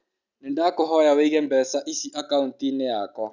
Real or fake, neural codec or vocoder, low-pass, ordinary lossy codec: real; none; 7.2 kHz; none